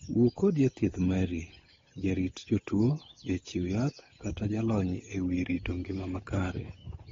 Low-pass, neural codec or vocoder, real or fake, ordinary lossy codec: 7.2 kHz; codec, 16 kHz, 8 kbps, FunCodec, trained on Chinese and English, 25 frames a second; fake; AAC, 24 kbps